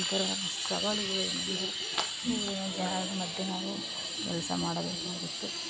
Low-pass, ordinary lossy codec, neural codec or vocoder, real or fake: none; none; none; real